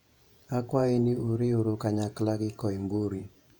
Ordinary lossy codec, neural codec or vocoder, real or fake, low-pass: none; vocoder, 48 kHz, 128 mel bands, Vocos; fake; 19.8 kHz